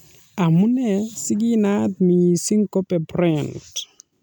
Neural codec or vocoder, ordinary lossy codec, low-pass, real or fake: none; none; none; real